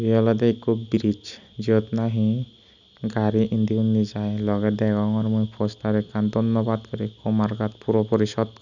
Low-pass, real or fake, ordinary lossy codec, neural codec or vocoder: 7.2 kHz; real; none; none